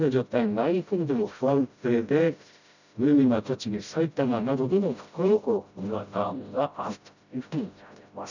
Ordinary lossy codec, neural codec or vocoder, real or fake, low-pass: none; codec, 16 kHz, 0.5 kbps, FreqCodec, smaller model; fake; 7.2 kHz